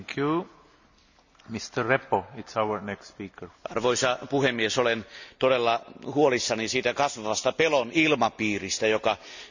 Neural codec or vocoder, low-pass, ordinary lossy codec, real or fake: none; 7.2 kHz; none; real